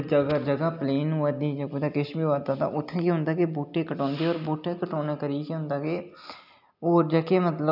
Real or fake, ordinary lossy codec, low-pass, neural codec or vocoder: real; none; 5.4 kHz; none